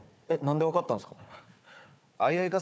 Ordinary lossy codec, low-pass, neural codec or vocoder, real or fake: none; none; codec, 16 kHz, 4 kbps, FunCodec, trained on Chinese and English, 50 frames a second; fake